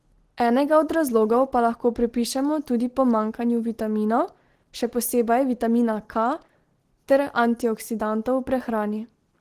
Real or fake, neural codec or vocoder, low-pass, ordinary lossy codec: real; none; 14.4 kHz; Opus, 16 kbps